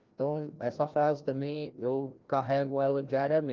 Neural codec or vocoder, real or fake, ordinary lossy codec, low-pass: codec, 16 kHz, 1 kbps, FreqCodec, larger model; fake; Opus, 32 kbps; 7.2 kHz